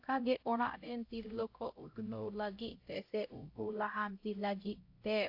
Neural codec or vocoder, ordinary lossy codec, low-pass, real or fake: codec, 16 kHz, 0.5 kbps, X-Codec, HuBERT features, trained on LibriSpeech; AAC, 32 kbps; 5.4 kHz; fake